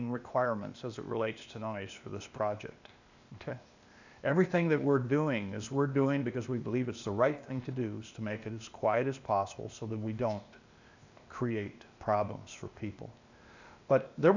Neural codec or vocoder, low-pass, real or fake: codec, 16 kHz, 0.8 kbps, ZipCodec; 7.2 kHz; fake